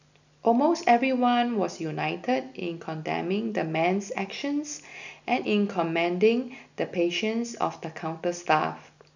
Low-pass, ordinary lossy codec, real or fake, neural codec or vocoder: 7.2 kHz; none; real; none